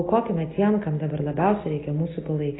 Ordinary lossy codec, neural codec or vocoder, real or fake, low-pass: AAC, 16 kbps; none; real; 7.2 kHz